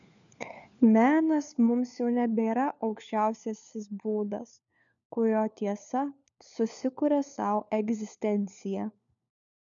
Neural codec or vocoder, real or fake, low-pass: codec, 16 kHz, 4 kbps, FunCodec, trained on LibriTTS, 50 frames a second; fake; 7.2 kHz